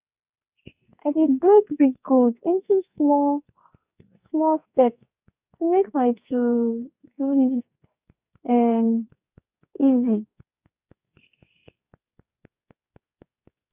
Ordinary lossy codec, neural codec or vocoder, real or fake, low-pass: Opus, 64 kbps; codec, 44.1 kHz, 2.6 kbps, SNAC; fake; 3.6 kHz